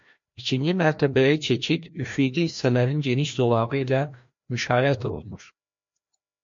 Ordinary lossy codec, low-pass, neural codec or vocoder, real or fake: MP3, 48 kbps; 7.2 kHz; codec, 16 kHz, 1 kbps, FreqCodec, larger model; fake